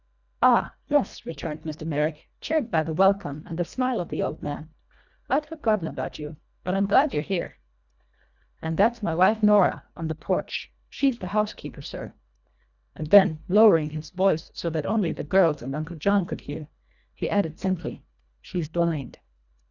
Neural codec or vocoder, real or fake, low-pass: codec, 24 kHz, 1.5 kbps, HILCodec; fake; 7.2 kHz